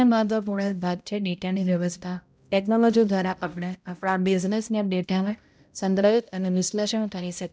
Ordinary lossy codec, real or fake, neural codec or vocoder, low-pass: none; fake; codec, 16 kHz, 0.5 kbps, X-Codec, HuBERT features, trained on balanced general audio; none